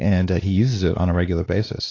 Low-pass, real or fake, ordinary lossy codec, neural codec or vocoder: 7.2 kHz; fake; AAC, 32 kbps; codec, 16 kHz, 2 kbps, X-Codec, WavLM features, trained on Multilingual LibriSpeech